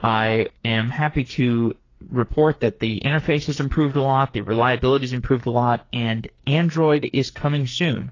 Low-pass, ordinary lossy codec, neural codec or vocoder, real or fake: 7.2 kHz; AAC, 32 kbps; codec, 44.1 kHz, 2.6 kbps, SNAC; fake